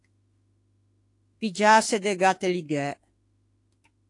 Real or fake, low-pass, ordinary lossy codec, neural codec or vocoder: fake; 10.8 kHz; AAC, 48 kbps; autoencoder, 48 kHz, 32 numbers a frame, DAC-VAE, trained on Japanese speech